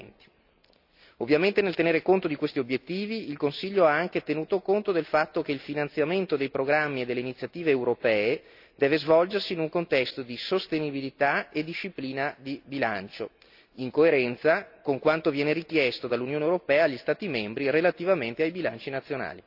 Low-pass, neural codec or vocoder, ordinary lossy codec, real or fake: 5.4 kHz; none; AAC, 48 kbps; real